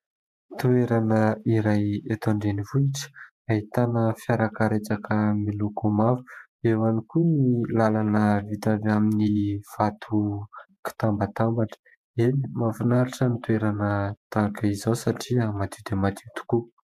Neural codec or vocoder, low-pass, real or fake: vocoder, 48 kHz, 128 mel bands, Vocos; 14.4 kHz; fake